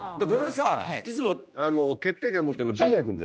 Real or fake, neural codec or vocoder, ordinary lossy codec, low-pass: fake; codec, 16 kHz, 1 kbps, X-Codec, HuBERT features, trained on general audio; none; none